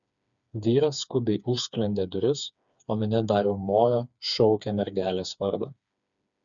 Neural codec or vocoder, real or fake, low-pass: codec, 16 kHz, 4 kbps, FreqCodec, smaller model; fake; 7.2 kHz